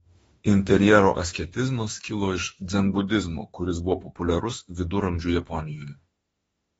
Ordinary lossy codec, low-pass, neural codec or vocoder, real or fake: AAC, 24 kbps; 19.8 kHz; autoencoder, 48 kHz, 32 numbers a frame, DAC-VAE, trained on Japanese speech; fake